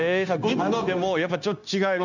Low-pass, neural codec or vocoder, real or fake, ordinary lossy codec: 7.2 kHz; codec, 16 kHz, 0.9 kbps, LongCat-Audio-Codec; fake; none